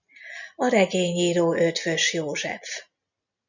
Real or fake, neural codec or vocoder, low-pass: real; none; 7.2 kHz